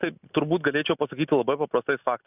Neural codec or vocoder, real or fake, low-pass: none; real; 3.6 kHz